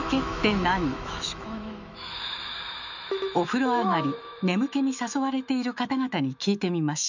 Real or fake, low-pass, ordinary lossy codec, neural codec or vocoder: fake; 7.2 kHz; none; autoencoder, 48 kHz, 128 numbers a frame, DAC-VAE, trained on Japanese speech